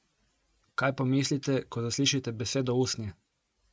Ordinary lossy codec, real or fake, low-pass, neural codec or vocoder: none; real; none; none